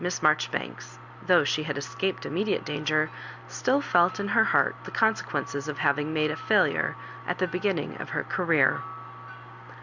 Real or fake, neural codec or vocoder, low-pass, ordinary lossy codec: real; none; 7.2 kHz; Opus, 64 kbps